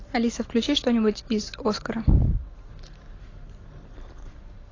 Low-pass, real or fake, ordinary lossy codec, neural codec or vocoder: 7.2 kHz; real; AAC, 32 kbps; none